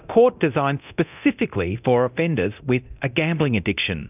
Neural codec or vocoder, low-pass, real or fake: codec, 24 kHz, 0.9 kbps, DualCodec; 3.6 kHz; fake